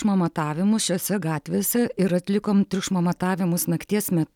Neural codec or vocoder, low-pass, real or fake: none; 19.8 kHz; real